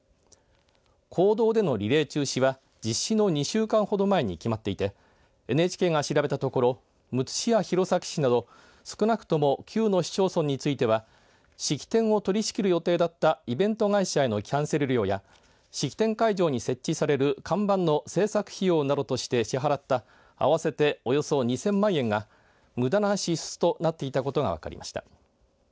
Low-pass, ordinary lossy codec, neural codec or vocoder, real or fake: none; none; none; real